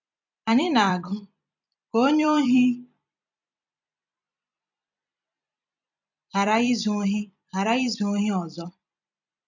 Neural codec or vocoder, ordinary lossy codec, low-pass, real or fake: vocoder, 44.1 kHz, 128 mel bands every 256 samples, BigVGAN v2; none; 7.2 kHz; fake